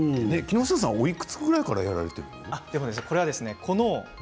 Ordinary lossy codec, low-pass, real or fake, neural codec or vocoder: none; none; real; none